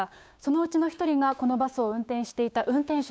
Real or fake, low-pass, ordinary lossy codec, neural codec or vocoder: fake; none; none; codec, 16 kHz, 6 kbps, DAC